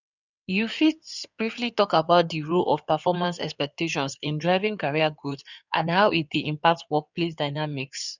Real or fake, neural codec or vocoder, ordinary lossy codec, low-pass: fake; codec, 16 kHz in and 24 kHz out, 2.2 kbps, FireRedTTS-2 codec; none; 7.2 kHz